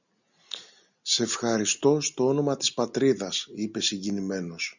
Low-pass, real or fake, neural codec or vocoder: 7.2 kHz; real; none